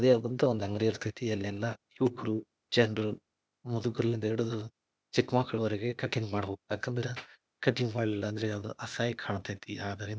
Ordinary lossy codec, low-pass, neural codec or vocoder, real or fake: none; none; codec, 16 kHz, 0.8 kbps, ZipCodec; fake